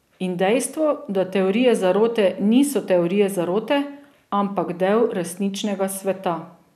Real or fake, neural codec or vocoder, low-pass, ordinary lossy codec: real; none; 14.4 kHz; none